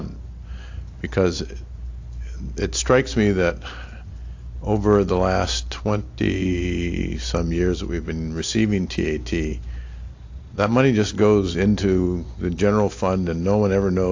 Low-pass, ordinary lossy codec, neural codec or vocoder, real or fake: 7.2 kHz; AAC, 48 kbps; none; real